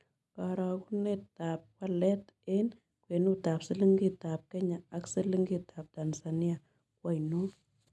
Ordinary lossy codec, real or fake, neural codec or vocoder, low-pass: none; real; none; none